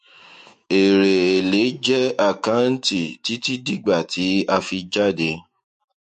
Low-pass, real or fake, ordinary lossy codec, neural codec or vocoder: 14.4 kHz; fake; MP3, 48 kbps; autoencoder, 48 kHz, 128 numbers a frame, DAC-VAE, trained on Japanese speech